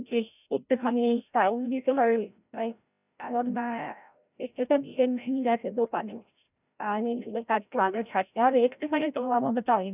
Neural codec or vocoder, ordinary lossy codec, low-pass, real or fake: codec, 16 kHz, 0.5 kbps, FreqCodec, larger model; none; 3.6 kHz; fake